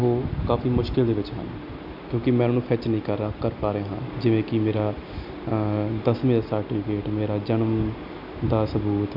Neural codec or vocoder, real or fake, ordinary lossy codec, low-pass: none; real; none; 5.4 kHz